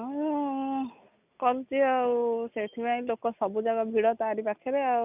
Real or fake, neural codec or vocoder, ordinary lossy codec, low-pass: real; none; none; 3.6 kHz